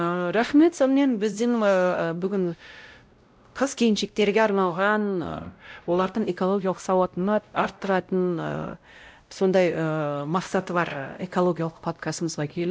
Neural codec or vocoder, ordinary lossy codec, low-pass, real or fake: codec, 16 kHz, 0.5 kbps, X-Codec, WavLM features, trained on Multilingual LibriSpeech; none; none; fake